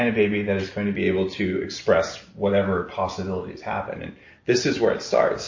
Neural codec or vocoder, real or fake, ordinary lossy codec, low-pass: none; real; MP3, 32 kbps; 7.2 kHz